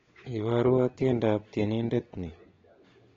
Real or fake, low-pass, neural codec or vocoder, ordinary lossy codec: fake; 7.2 kHz; codec, 16 kHz, 16 kbps, FunCodec, trained on LibriTTS, 50 frames a second; AAC, 24 kbps